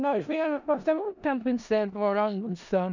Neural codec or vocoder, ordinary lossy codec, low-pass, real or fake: codec, 16 kHz in and 24 kHz out, 0.4 kbps, LongCat-Audio-Codec, four codebook decoder; none; 7.2 kHz; fake